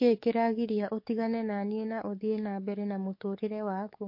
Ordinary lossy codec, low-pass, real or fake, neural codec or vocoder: MP3, 32 kbps; 5.4 kHz; fake; codec, 44.1 kHz, 7.8 kbps, DAC